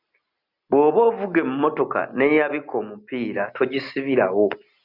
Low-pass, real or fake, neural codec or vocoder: 5.4 kHz; real; none